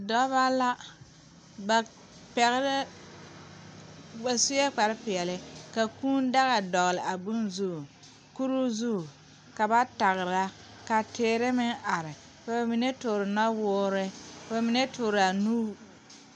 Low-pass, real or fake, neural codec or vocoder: 9.9 kHz; real; none